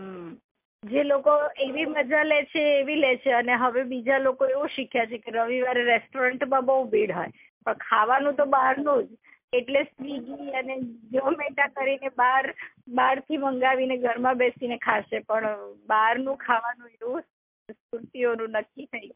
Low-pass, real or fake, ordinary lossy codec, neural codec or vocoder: 3.6 kHz; real; MP3, 32 kbps; none